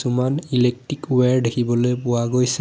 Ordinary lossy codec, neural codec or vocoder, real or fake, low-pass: none; none; real; none